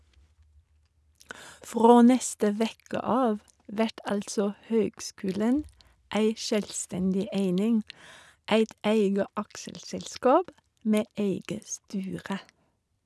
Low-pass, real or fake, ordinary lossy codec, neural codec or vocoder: none; real; none; none